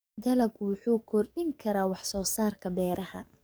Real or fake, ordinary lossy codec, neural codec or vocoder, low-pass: fake; none; codec, 44.1 kHz, 7.8 kbps, DAC; none